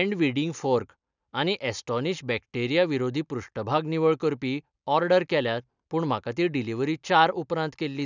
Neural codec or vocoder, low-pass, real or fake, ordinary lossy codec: none; 7.2 kHz; real; none